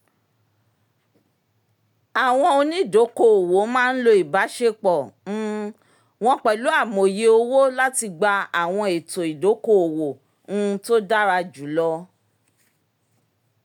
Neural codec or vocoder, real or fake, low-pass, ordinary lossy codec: none; real; 19.8 kHz; none